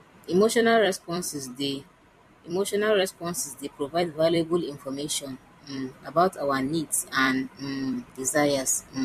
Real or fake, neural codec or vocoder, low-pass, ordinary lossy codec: fake; vocoder, 44.1 kHz, 128 mel bands every 256 samples, BigVGAN v2; 14.4 kHz; MP3, 64 kbps